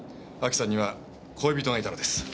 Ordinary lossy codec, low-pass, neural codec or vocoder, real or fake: none; none; none; real